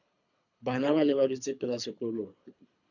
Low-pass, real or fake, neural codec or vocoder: 7.2 kHz; fake; codec, 24 kHz, 3 kbps, HILCodec